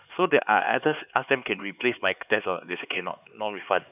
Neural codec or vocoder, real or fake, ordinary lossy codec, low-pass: codec, 16 kHz, 4 kbps, X-Codec, HuBERT features, trained on LibriSpeech; fake; none; 3.6 kHz